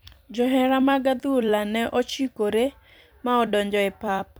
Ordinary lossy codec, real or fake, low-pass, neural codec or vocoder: none; fake; none; vocoder, 44.1 kHz, 128 mel bands every 256 samples, BigVGAN v2